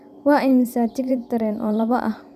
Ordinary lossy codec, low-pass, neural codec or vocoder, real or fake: none; 14.4 kHz; vocoder, 44.1 kHz, 128 mel bands every 512 samples, BigVGAN v2; fake